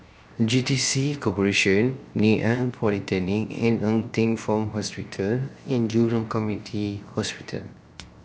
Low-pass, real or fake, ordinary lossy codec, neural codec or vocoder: none; fake; none; codec, 16 kHz, 0.7 kbps, FocalCodec